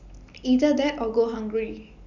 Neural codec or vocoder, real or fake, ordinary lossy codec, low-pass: none; real; none; 7.2 kHz